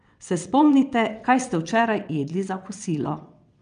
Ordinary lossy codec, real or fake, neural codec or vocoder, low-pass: none; fake; vocoder, 22.05 kHz, 80 mel bands, WaveNeXt; 9.9 kHz